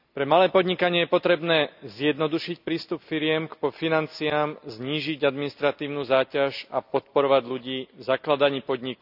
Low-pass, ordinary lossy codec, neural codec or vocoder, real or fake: 5.4 kHz; none; none; real